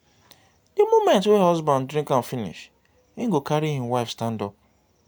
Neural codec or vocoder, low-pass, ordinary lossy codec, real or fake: none; none; none; real